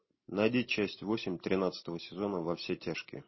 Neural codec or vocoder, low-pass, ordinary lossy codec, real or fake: none; 7.2 kHz; MP3, 24 kbps; real